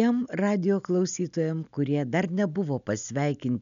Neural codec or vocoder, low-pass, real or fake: none; 7.2 kHz; real